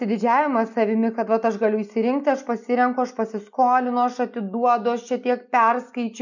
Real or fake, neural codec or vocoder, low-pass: real; none; 7.2 kHz